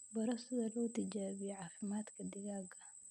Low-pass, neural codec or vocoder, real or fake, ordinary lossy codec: none; none; real; none